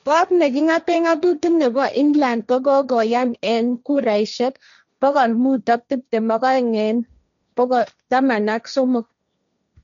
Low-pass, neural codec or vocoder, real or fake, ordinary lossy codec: 7.2 kHz; codec, 16 kHz, 1.1 kbps, Voila-Tokenizer; fake; none